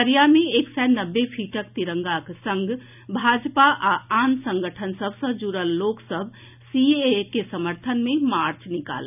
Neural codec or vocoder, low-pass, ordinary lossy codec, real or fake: none; 3.6 kHz; none; real